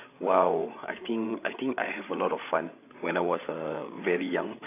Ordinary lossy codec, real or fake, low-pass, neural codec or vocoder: AAC, 24 kbps; fake; 3.6 kHz; codec, 16 kHz, 8 kbps, FreqCodec, larger model